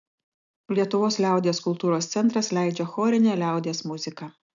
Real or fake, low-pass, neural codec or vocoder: real; 7.2 kHz; none